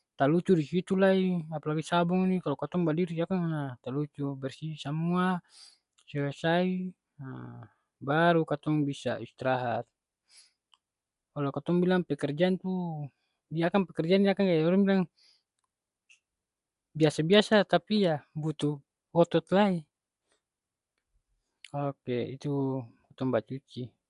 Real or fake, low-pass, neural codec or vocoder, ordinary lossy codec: real; 10.8 kHz; none; none